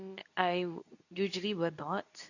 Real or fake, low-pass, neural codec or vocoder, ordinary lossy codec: fake; 7.2 kHz; codec, 24 kHz, 0.9 kbps, WavTokenizer, medium speech release version 2; none